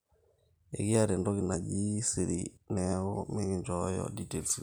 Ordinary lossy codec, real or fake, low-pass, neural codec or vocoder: none; real; none; none